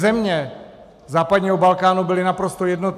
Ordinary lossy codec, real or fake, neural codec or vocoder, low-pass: AAC, 96 kbps; real; none; 14.4 kHz